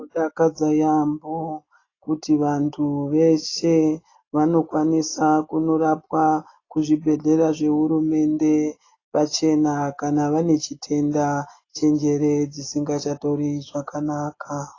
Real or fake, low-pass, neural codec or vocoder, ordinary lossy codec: real; 7.2 kHz; none; AAC, 32 kbps